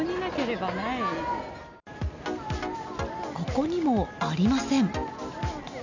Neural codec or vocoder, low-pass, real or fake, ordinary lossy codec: none; 7.2 kHz; real; none